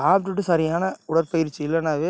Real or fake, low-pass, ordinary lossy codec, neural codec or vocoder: real; none; none; none